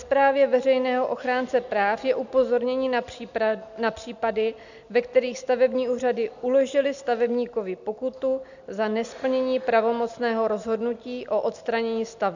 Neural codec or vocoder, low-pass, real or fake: none; 7.2 kHz; real